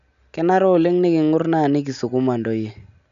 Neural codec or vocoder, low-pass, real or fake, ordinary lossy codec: none; 7.2 kHz; real; none